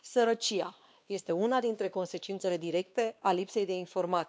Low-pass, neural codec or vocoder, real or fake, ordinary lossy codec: none; codec, 16 kHz, 2 kbps, X-Codec, WavLM features, trained on Multilingual LibriSpeech; fake; none